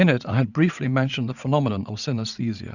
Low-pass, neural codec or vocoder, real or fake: 7.2 kHz; vocoder, 44.1 kHz, 80 mel bands, Vocos; fake